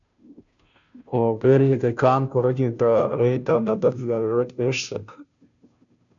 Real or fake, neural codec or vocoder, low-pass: fake; codec, 16 kHz, 0.5 kbps, FunCodec, trained on Chinese and English, 25 frames a second; 7.2 kHz